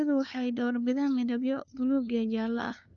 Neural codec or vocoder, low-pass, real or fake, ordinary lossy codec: codec, 16 kHz, 2 kbps, FunCodec, trained on LibriTTS, 25 frames a second; 7.2 kHz; fake; none